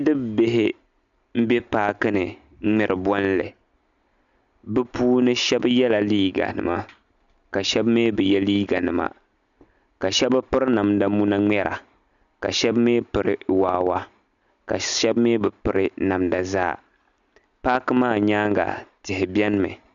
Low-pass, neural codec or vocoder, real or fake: 7.2 kHz; none; real